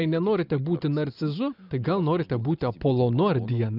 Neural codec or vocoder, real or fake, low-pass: none; real; 5.4 kHz